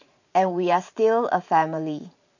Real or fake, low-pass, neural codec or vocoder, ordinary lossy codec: fake; 7.2 kHz; vocoder, 44.1 kHz, 128 mel bands every 512 samples, BigVGAN v2; none